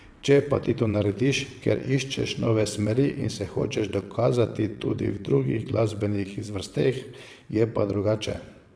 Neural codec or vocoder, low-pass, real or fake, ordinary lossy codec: vocoder, 22.05 kHz, 80 mel bands, WaveNeXt; none; fake; none